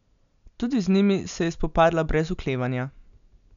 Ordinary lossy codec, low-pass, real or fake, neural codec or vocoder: none; 7.2 kHz; real; none